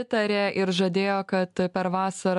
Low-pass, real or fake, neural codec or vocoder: 10.8 kHz; real; none